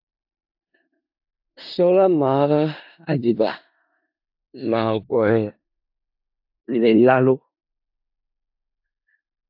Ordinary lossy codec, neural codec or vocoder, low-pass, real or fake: AAC, 48 kbps; codec, 16 kHz in and 24 kHz out, 0.4 kbps, LongCat-Audio-Codec, four codebook decoder; 5.4 kHz; fake